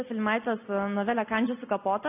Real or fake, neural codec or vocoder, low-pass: real; none; 3.6 kHz